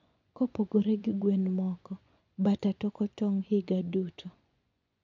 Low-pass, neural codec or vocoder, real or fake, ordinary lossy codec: 7.2 kHz; vocoder, 24 kHz, 100 mel bands, Vocos; fake; none